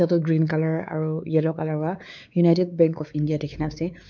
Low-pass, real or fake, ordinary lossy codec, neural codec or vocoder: 7.2 kHz; fake; none; codec, 16 kHz, 4 kbps, X-Codec, WavLM features, trained on Multilingual LibriSpeech